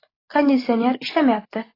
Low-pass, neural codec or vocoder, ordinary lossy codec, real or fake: 5.4 kHz; none; AAC, 24 kbps; real